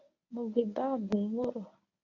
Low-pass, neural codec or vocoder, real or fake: 7.2 kHz; codec, 24 kHz, 0.9 kbps, WavTokenizer, medium speech release version 1; fake